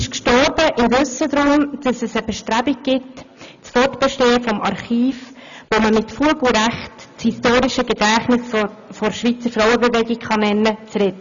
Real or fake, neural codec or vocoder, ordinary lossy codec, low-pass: real; none; none; 7.2 kHz